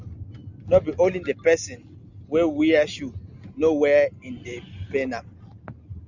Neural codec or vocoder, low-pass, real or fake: none; 7.2 kHz; real